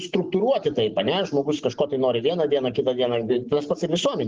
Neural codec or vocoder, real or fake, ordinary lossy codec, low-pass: none; real; Opus, 24 kbps; 7.2 kHz